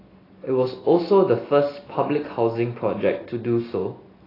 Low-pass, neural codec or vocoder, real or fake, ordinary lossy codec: 5.4 kHz; none; real; AAC, 24 kbps